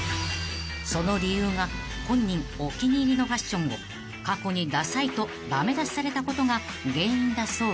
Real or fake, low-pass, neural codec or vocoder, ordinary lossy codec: real; none; none; none